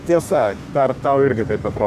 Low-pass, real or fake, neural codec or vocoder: 14.4 kHz; fake; codec, 32 kHz, 1.9 kbps, SNAC